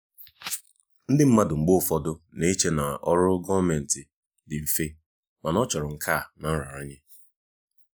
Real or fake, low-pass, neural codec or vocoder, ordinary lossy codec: real; none; none; none